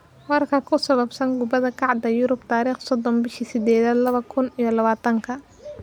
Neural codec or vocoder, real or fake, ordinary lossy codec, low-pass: none; real; none; 19.8 kHz